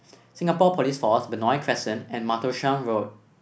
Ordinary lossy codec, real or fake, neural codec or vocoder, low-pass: none; real; none; none